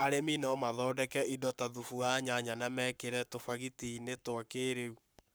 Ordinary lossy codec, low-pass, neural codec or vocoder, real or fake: none; none; codec, 44.1 kHz, 7.8 kbps, Pupu-Codec; fake